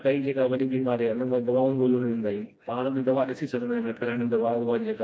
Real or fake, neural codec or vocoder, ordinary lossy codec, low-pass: fake; codec, 16 kHz, 1 kbps, FreqCodec, smaller model; none; none